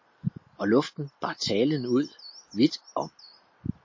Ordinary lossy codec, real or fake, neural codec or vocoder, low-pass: MP3, 32 kbps; real; none; 7.2 kHz